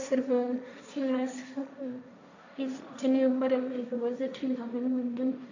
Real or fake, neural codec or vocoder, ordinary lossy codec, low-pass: fake; codec, 16 kHz, 1.1 kbps, Voila-Tokenizer; AAC, 48 kbps; 7.2 kHz